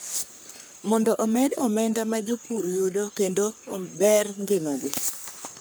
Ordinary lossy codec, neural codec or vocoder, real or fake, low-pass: none; codec, 44.1 kHz, 3.4 kbps, Pupu-Codec; fake; none